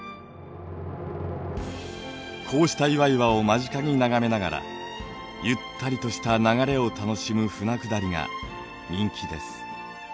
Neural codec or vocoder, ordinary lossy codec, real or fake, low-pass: none; none; real; none